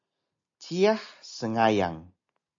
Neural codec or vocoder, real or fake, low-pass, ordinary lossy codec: none; real; 7.2 kHz; AAC, 48 kbps